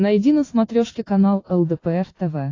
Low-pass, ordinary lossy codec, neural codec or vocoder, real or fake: 7.2 kHz; AAC, 32 kbps; none; real